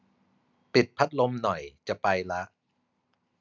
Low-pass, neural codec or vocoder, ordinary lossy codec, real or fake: 7.2 kHz; none; none; real